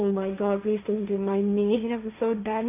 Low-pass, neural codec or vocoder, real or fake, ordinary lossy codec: 3.6 kHz; codec, 16 kHz, 1.1 kbps, Voila-Tokenizer; fake; none